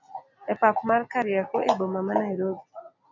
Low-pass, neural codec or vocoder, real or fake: 7.2 kHz; none; real